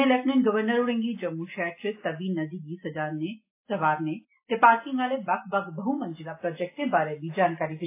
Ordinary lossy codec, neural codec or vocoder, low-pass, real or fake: AAC, 24 kbps; none; 3.6 kHz; real